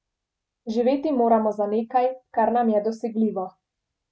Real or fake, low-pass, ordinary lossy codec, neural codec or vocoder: real; none; none; none